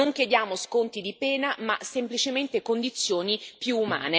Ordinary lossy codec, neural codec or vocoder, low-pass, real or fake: none; none; none; real